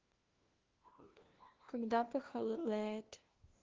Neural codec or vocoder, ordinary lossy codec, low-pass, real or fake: codec, 16 kHz, 1 kbps, FunCodec, trained on LibriTTS, 50 frames a second; Opus, 16 kbps; 7.2 kHz; fake